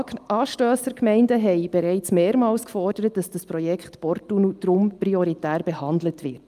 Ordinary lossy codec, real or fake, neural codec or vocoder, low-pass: Opus, 32 kbps; real; none; 14.4 kHz